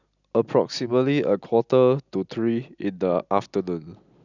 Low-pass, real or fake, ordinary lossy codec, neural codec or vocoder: 7.2 kHz; real; none; none